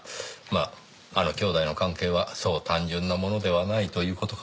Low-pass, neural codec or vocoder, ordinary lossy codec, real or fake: none; none; none; real